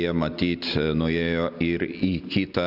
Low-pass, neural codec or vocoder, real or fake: 5.4 kHz; none; real